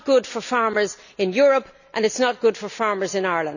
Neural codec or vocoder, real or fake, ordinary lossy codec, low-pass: none; real; none; 7.2 kHz